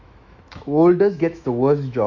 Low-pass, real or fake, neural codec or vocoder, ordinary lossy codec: 7.2 kHz; fake; autoencoder, 48 kHz, 128 numbers a frame, DAC-VAE, trained on Japanese speech; none